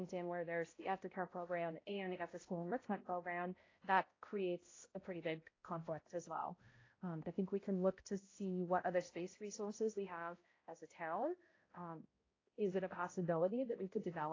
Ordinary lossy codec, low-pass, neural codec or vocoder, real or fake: AAC, 32 kbps; 7.2 kHz; codec, 16 kHz, 0.5 kbps, X-Codec, HuBERT features, trained on balanced general audio; fake